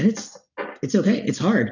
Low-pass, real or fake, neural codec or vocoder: 7.2 kHz; real; none